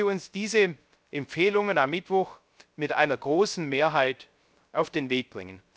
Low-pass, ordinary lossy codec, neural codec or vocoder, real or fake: none; none; codec, 16 kHz, 0.3 kbps, FocalCodec; fake